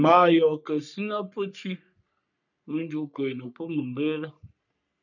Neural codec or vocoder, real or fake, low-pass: codec, 44.1 kHz, 3.4 kbps, Pupu-Codec; fake; 7.2 kHz